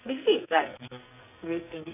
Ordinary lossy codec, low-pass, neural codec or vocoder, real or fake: none; 3.6 kHz; codec, 44.1 kHz, 2.6 kbps, SNAC; fake